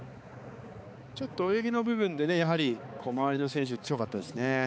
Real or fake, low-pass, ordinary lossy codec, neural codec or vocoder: fake; none; none; codec, 16 kHz, 4 kbps, X-Codec, HuBERT features, trained on balanced general audio